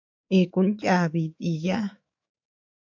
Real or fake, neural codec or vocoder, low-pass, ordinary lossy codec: fake; autoencoder, 48 kHz, 128 numbers a frame, DAC-VAE, trained on Japanese speech; 7.2 kHz; AAC, 48 kbps